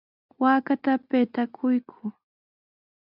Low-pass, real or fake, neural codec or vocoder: 5.4 kHz; real; none